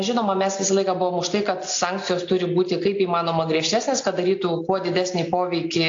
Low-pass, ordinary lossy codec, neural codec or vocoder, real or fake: 7.2 kHz; AAC, 48 kbps; none; real